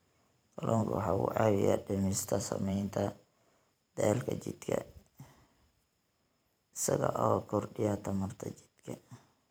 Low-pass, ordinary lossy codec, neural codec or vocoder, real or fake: none; none; vocoder, 44.1 kHz, 128 mel bands every 512 samples, BigVGAN v2; fake